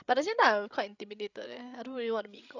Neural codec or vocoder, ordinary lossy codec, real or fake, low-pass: codec, 16 kHz, 8 kbps, FreqCodec, larger model; none; fake; 7.2 kHz